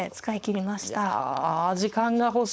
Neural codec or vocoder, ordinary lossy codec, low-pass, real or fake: codec, 16 kHz, 4.8 kbps, FACodec; none; none; fake